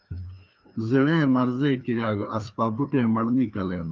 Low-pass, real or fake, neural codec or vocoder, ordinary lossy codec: 7.2 kHz; fake; codec, 16 kHz, 2 kbps, FreqCodec, larger model; Opus, 24 kbps